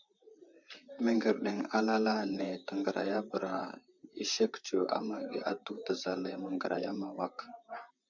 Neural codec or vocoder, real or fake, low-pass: vocoder, 44.1 kHz, 128 mel bands, Pupu-Vocoder; fake; 7.2 kHz